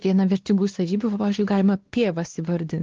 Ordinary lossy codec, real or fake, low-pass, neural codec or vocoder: Opus, 16 kbps; fake; 7.2 kHz; codec, 16 kHz, 2 kbps, X-Codec, WavLM features, trained on Multilingual LibriSpeech